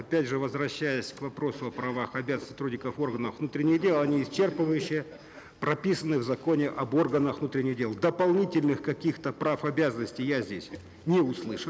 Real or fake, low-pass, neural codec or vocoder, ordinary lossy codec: real; none; none; none